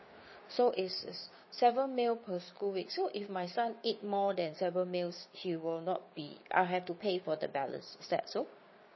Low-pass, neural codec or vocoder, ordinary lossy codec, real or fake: 7.2 kHz; codec, 16 kHz, 6 kbps, DAC; MP3, 24 kbps; fake